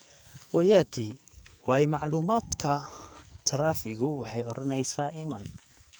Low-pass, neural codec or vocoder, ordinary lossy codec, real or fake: none; codec, 44.1 kHz, 2.6 kbps, SNAC; none; fake